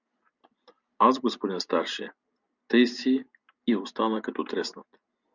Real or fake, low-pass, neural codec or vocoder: real; 7.2 kHz; none